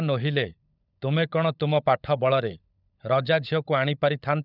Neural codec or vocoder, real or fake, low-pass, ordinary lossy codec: codec, 16 kHz, 16 kbps, FunCodec, trained on LibriTTS, 50 frames a second; fake; 5.4 kHz; none